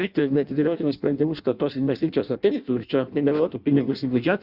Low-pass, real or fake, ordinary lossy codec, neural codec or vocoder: 5.4 kHz; fake; Opus, 64 kbps; codec, 16 kHz in and 24 kHz out, 0.6 kbps, FireRedTTS-2 codec